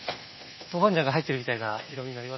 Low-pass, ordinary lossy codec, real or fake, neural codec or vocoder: 7.2 kHz; MP3, 24 kbps; fake; codec, 24 kHz, 1.2 kbps, DualCodec